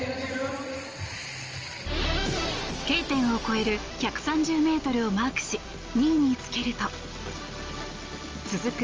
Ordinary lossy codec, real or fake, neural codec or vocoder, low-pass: Opus, 24 kbps; real; none; 7.2 kHz